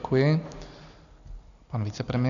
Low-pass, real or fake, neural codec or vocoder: 7.2 kHz; real; none